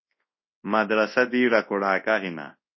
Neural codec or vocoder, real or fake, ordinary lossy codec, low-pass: codec, 24 kHz, 0.9 kbps, WavTokenizer, large speech release; fake; MP3, 24 kbps; 7.2 kHz